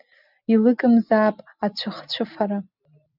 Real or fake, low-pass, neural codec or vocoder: real; 5.4 kHz; none